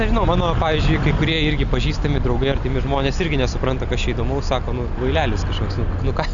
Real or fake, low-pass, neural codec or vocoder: real; 7.2 kHz; none